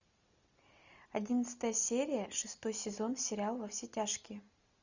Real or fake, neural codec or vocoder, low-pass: real; none; 7.2 kHz